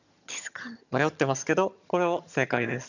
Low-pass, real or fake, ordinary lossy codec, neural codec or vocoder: 7.2 kHz; fake; none; vocoder, 22.05 kHz, 80 mel bands, HiFi-GAN